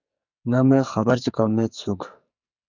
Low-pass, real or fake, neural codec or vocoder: 7.2 kHz; fake; codec, 44.1 kHz, 2.6 kbps, SNAC